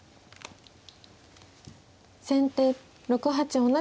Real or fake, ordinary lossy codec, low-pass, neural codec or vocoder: real; none; none; none